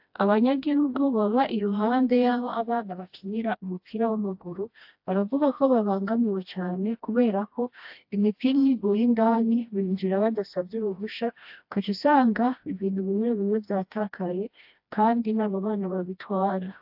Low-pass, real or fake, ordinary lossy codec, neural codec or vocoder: 5.4 kHz; fake; AAC, 48 kbps; codec, 16 kHz, 1 kbps, FreqCodec, smaller model